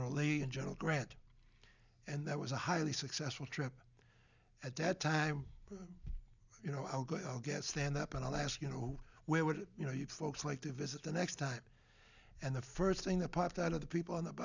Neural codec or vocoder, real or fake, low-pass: none; real; 7.2 kHz